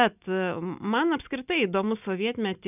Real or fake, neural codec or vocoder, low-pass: real; none; 3.6 kHz